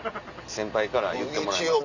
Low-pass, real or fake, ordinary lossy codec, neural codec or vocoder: 7.2 kHz; real; none; none